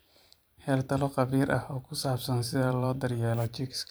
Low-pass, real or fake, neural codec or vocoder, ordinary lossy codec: none; fake; vocoder, 44.1 kHz, 128 mel bands every 512 samples, BigVGAN v2; none